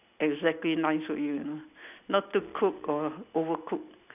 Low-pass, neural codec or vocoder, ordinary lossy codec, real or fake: 3.6 kHz; none; none; real